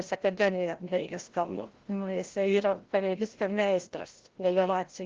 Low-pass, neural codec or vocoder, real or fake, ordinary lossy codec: 7.2 kHz; codec, 16 kHz, 0.5 kbps, FreqCodec, larger model; fake; Opus, 16 kbps